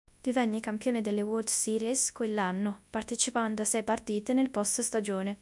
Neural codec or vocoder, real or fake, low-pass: codec, 24 kHz, 0.9 kbps, WavTokenizer, large speech release; fake; 10.8 kHz